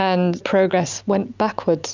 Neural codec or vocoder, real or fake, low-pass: none; real; 7.2 kHz